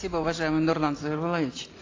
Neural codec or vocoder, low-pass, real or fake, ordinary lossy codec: none; 7.2 kHz; real; AAC, 32 kbps